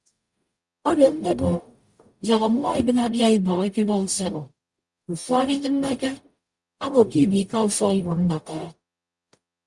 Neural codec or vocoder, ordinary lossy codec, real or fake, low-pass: codec, 44.1 kHz, 0.9 kbps, DAC; Opus, 64 kbps; fake; 10.8 kHz